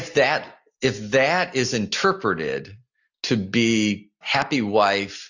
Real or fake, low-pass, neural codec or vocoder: real; 7.2 kHz; none